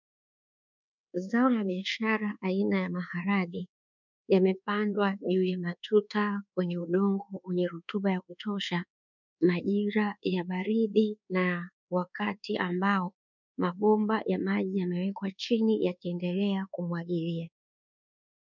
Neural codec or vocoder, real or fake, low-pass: codec, 24 kHz, 1.2 kbps, DualCodec; fake; 7.2 kHz